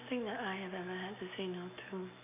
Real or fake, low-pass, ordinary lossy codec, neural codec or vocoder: real; 3.6 kHz; none; none